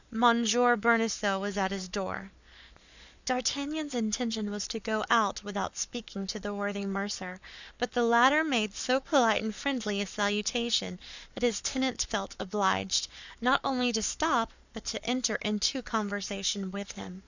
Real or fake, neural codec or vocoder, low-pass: fake; codec, 44.1 kHz, 7.8 kbps, Pupu-Codec; 7.2 kHz